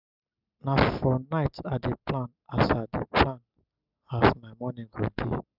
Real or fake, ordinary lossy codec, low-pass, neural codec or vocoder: real; none; 5.4 kHz; none